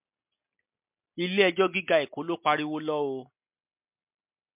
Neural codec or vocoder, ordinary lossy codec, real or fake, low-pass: none; MP3, 32 kbps; real; 3.6 kHz